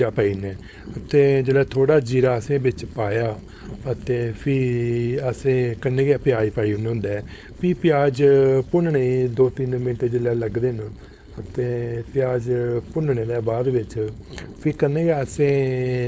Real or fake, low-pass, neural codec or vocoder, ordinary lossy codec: fake; none; codec, 16 kHz, 4.8 kbps, FACodec; none